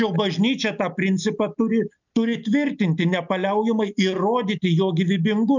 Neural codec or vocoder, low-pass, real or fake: none; 7.2 kHz; real